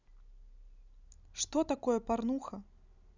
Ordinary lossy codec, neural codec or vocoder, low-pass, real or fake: none; none; 7.2 kHz; real